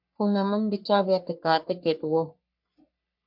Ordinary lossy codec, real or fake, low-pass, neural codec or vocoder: MP3, 48 kbps; fake; 5.4 kHz; codec, 44.1 kHz, 3.4 kbps, Pupu-Codec